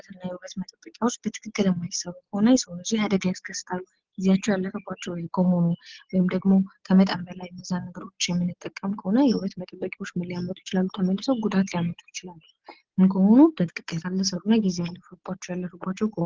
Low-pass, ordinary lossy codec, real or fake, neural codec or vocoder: 7.2 kHz; Opus, 16 kbps; real; none